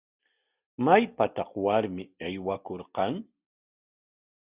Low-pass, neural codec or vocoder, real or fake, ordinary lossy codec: 3.6 kHz; none; real; Opus, 64 kbps